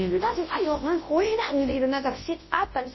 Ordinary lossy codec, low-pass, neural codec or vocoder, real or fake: MP3, 24 kbps; 7.2 kHz; codec, 24 kHz, 0.9 kbps, WavTokenizer, large speech release; fake